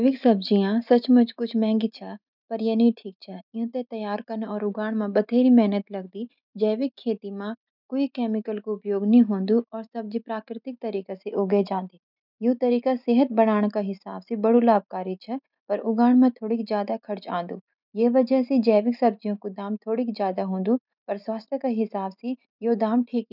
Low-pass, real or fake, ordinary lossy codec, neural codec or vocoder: 5.4 kHz; real; none; none